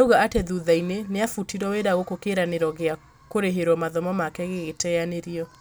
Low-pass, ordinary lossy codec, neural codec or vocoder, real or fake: none; none; none; real